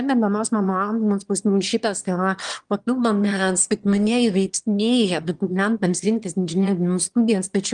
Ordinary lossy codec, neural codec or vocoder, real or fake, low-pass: Opus, 24 kbps; autoencoder, 22.05 kHz, a latent of 192 numbers a frame, VITS, trained on one speaker; fake; 9.9 kHz